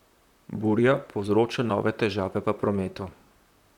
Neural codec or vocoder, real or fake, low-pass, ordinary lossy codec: vocoder, 44.1 kHz, 128 mel bands, Pupu-Vocoder; fake; 19.8 kHz; none